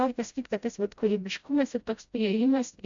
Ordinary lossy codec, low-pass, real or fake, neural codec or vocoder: MP3, 64 kbps; 7.2 kHz; fake; codec, 16 kHz, 0.5 kbps, FreqCodec, smaller model